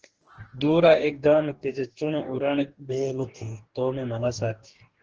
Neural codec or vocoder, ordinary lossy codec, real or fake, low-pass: codec, 44.1 kHz, 2.6 kbps, DAC; Opus, 16 kbps; fake; 7.2 kHz